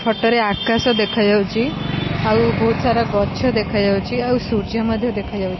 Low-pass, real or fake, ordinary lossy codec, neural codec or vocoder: 7.2 kHz; real; MP3, 24 kbps; none